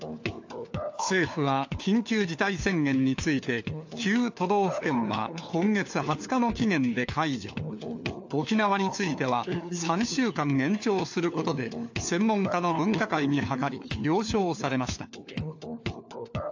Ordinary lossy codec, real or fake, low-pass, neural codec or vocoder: AAC, 48 kbps; fake; 7.2 kHz; codec, 16 kHz, 4 kbps, FunCodec, trained on LibriTTS, 50 frames a second